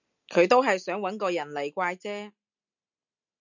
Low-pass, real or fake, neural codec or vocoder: 7.2 kHz; real; none